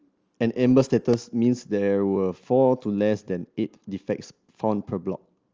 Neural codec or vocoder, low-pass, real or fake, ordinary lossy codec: none; 7.2 kHz; real; Opus, 24 kbps